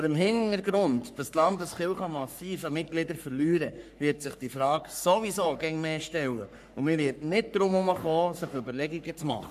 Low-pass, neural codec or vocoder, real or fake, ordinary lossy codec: 14.4 kHz; codec, 44.1 kHz, 3.4 kbps, Pupu-Codec; fake; none